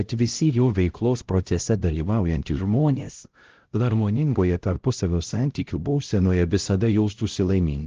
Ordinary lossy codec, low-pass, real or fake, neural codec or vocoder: Opus, 16 kbps; 7.2 kHz; fake; codec, 16 kHz, 0.5 kbps, X-Codec, HuBERT features, trained on LibriSpeech